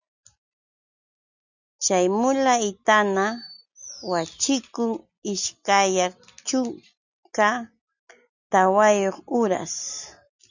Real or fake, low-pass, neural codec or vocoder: real; 7.2 kHz; none